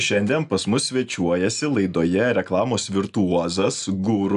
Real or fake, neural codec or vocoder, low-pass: real; none; 10.8 kHz